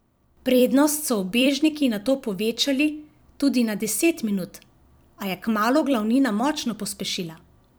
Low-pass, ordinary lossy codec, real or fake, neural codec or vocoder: none; none; fake; vocoder, 44.1 kHz, 128 mel bands every 256 samples, BigVGAN v2